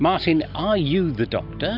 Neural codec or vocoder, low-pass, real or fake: none; 5.4 kHz; real